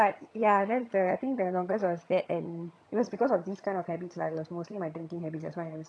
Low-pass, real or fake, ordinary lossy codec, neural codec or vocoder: none; fake; none; vocoder, 22.05 kHz, 80 mel bands, HiFi-GAN